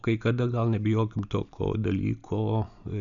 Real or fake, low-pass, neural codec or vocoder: real; 7.2 kHz; none